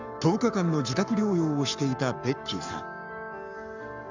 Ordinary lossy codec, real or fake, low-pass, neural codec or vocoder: none; fake; 7.2 kHz; codec, 44.1 kHz, 7.8 kbps, Pupu-Codec